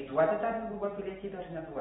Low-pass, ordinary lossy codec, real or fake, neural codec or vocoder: 19.8 kHz; AAC, 16 kbps; real; none